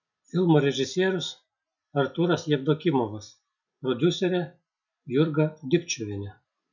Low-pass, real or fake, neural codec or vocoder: 7.2 kHz; real; none